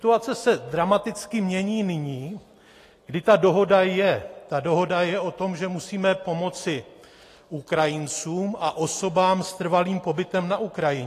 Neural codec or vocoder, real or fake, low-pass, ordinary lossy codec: none; real; 14.4 kHz; AAC, 48 kbps